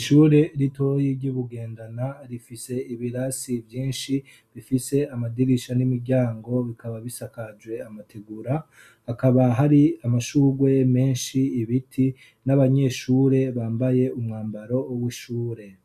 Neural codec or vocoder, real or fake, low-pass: none; real; 14.4 kHz